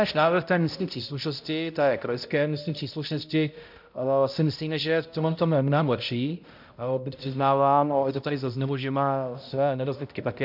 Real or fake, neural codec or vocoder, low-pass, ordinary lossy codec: fake; codec, 16 kHz, 0.5 kbps, X-Codec, HuBERT features, trained on balanced general audio; 5.4 kHz; MP3, 48 kbps